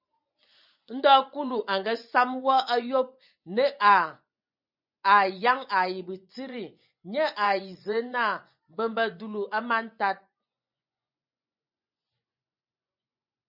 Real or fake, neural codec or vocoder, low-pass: fake; vocoder, 24 kHz, 100 mel bands, Vocos; 5.4 kHz